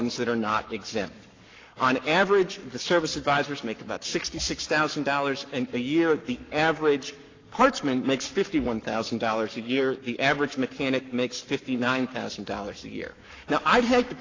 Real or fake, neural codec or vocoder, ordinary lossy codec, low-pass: fake; codec, 44.1 kHz, 7.8 kbps, Pupu-Codec; AAC, 32 kbps; 7.2 kHz